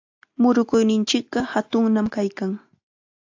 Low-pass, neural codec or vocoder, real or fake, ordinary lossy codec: 7.2 kHz; none; real; AAC, 48 kbps